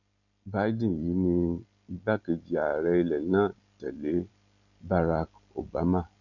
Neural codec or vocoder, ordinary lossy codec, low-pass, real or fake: none; none; 7.2 kHz; real